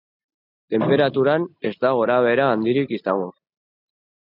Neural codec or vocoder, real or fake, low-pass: none; real; 5.4 kHz